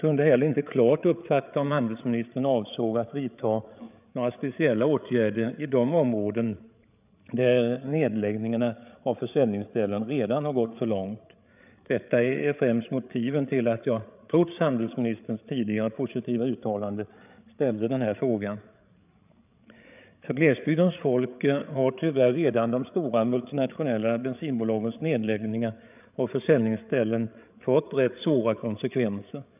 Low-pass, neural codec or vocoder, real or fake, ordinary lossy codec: 3.6 kHz; codec, 16 kHz, 8 kbps, FreqCodec, larger model; fake; none